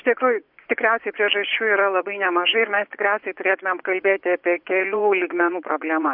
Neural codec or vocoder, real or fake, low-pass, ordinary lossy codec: vocoder, 24 kHz, 100 mel bands, Vocos; fake; 5.4 kHz; MP3, 48 kbps